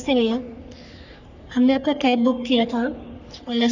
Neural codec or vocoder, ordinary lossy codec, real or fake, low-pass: codec, 44.1 kHz, 3.4 kbps, Pupu-Codec; none; fake; 7.2 kHz